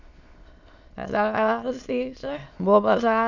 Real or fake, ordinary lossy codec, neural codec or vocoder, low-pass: fake; none; autoencoder, 22.05 kHz, a latent of 192 numbers a frame, VITS, trained on many speakers; 7.2 kHz